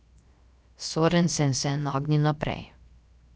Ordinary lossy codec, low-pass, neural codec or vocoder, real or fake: none; none; codec, 16 kHz, 0.7 kbps, FocalCodec; fake